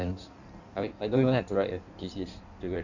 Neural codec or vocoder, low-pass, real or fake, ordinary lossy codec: codec, 16 kHz in and 24 kHz out, 1.1 kbps, FireRedTTS-2 codec; 7.2 kHz; fake; none